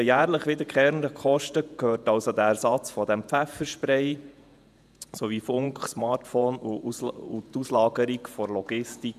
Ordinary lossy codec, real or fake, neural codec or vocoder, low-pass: none; fake; vocoder, 48 kHz, 128 mel bands, Vocos; 14.4 kHz